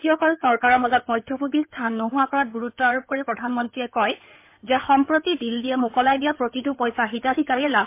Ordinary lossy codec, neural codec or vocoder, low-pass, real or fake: MP3, 24 kbps; codec, 16 kHz in and 24 kHz out, 2.2 kbps, FireRedTTS-2 codec; 3.6 kHz; fake